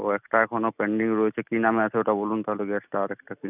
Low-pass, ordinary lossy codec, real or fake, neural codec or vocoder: 3.6 kHz; none; real; none